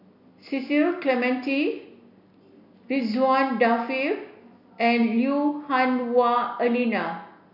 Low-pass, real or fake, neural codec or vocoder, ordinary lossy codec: 5.4 kHz; real; none; none